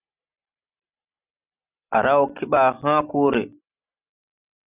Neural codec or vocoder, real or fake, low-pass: none; real; 3.6 kHz